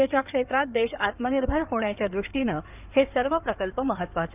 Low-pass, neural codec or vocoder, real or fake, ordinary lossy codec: 3.6 kHz; codec, 16 kHz in and 24 kHz out, 2.2 kbps, FireRedTTS-2 codec; fake; none